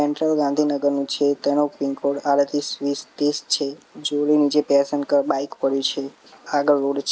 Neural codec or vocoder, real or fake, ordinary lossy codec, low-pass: none; real; none; none